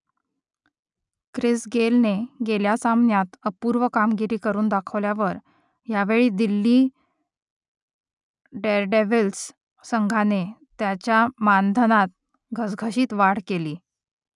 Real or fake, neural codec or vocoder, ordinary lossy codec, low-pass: real; none; none; 10.8 kHz